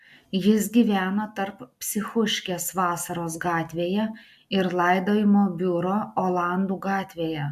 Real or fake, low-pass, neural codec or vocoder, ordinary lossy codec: real; 14.4 kHz; none; AAC, 96 kbps